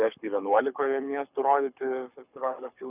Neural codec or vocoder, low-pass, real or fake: codec, 24 kHz, 6 kbps, HILCodec; 3.6 kHz; fake